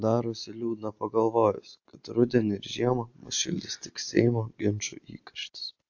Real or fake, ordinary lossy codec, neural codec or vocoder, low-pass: real; AAC, 48 kbps; none; 7.2 kHz